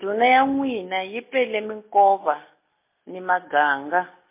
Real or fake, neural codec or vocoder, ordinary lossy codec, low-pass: real; none; MP3, 24 kbps; 3.6 kHz